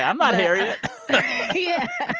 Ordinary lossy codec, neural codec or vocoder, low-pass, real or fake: Opus, 24 kbps; none; 7.2 kHz; real